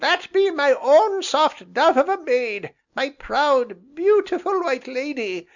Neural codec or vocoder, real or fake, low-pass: none; real; 7.2 kHz